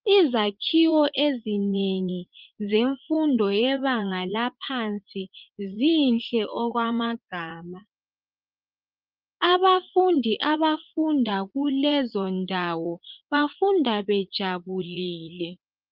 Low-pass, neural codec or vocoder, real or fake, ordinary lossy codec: 5.4 kHz; vocoder, 44.1 kHz, 80 mel bands, Vocos; fake; Opus, 24 kbps